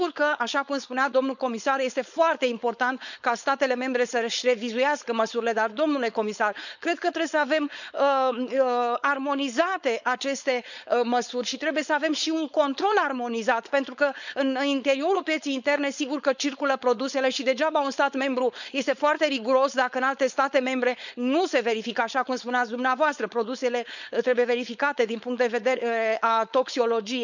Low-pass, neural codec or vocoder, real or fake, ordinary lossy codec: 7.2 kHz; codec, 16 kHz, 4.8 kbps, FACodec; fake; none